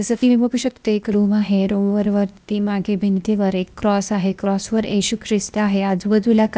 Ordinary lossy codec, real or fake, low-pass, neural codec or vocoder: none; fake; none; codec, 16 kHz, 0.8 kbps, ZipCodec